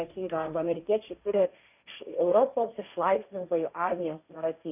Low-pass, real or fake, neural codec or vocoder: 3.6 kHz; fake; codec, 16 kHz, 1.1 kbps, Voila-Tokenizer